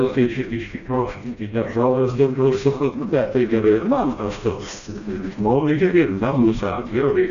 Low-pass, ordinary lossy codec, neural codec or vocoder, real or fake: 7.2 kHz; MP3, 96 kbps; codec, 16 kHz, 1 kbps, FreqCodec, smaller model; fake